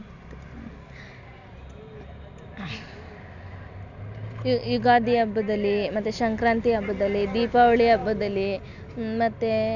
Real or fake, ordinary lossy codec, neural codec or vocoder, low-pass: real; none; none; 7.2 kHz